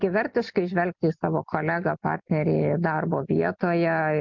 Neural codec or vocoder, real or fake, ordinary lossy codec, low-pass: none; real; MP3, 64 kbps; 7.2 kHz